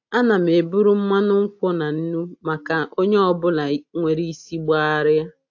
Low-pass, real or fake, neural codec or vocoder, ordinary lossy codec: 7.2 kHz; real; none; AAC, 48 kbps